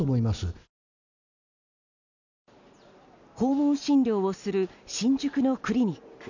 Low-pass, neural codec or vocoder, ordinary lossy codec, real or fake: 7.2 kHz; none; none; real